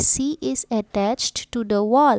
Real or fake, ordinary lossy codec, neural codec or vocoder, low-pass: real; none; none; none